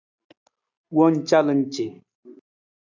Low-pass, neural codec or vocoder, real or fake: 7.2 kHz; none; real